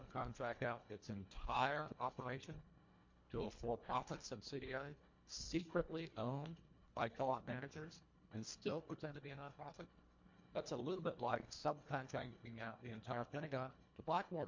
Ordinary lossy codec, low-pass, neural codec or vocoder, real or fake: MP3, 48 kbps; 7.2 kHz; codec, 24 kHz, 1.5 kbps, HILCodec; fake